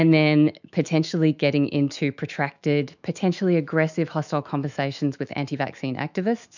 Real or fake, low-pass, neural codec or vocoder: fake; 7.2 kHz; autoencoder, 48 kHz, 128 numbers a frame, DAC-VAE, trained on Japanese speech